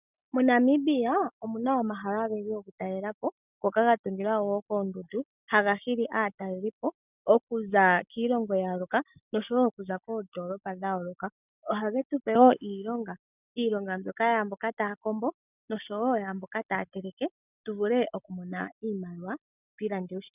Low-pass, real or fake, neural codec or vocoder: 3.6 kHz; real; none